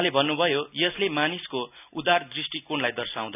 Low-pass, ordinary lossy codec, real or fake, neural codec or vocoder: 3.6 kHz; none; real; none